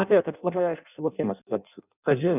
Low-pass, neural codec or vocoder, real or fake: 3.6 kHz; codec, 16 kHz in and 24 kHz out, 0.6 kbps, FireRedTTS-2 codec; fake